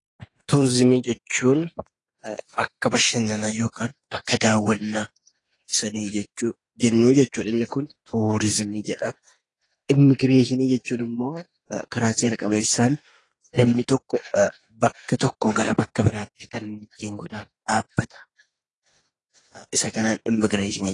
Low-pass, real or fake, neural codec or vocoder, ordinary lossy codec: 10.8 kHz; fake; autoencoder, 48 kHz, 32 numbers a frame, DAC-VAE, trained on Japanese speech; AAC, 32 kbps